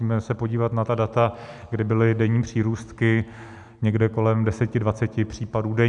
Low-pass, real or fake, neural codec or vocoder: 10.8 kHz; real; none